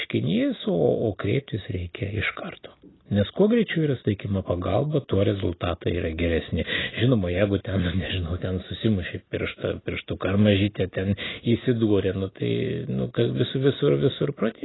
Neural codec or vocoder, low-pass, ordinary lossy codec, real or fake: none; 7.2 kHz; AAC, 16 kbps; real